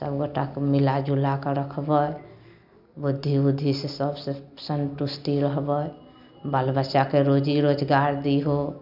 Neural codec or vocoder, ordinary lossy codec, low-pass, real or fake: none; none; 5.4 kHz; real